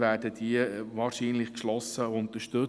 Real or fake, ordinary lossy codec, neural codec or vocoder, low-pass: real; none; none; none